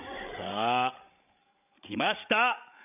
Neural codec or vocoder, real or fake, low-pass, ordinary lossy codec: codec, 16 kHz, 8 kbps, FreqCodec, larger model; fake; 3.6 kHz; none